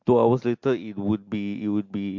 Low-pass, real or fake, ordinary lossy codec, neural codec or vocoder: 7.2 kHz; real; MP3, 48 kbps; none